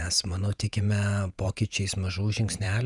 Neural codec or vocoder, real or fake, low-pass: vocoder, 48 kHz, 128 mel bands, Vocos; fake; 10.8 kHz